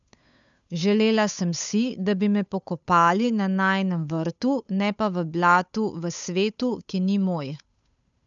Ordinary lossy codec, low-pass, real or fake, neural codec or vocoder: none; 7.2 kHz; fake; codec, 16 kHz, 8 kbps, FunCodec, trained on Chinese and English, 25 frames a second